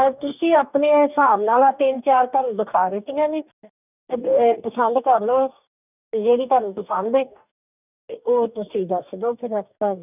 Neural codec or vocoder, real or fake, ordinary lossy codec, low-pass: codec, 44.1 kHz, 2.6 kbps, DAC; fake; none; 3.6 kHz